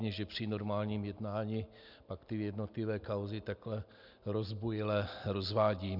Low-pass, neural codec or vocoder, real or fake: 5.4 kHz; none; real